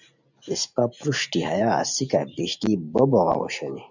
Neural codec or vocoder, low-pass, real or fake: vocoder, 44.1 kHz, 128 mel bands every 512 samples, BigVGAN v2; 7.2 kHz; fake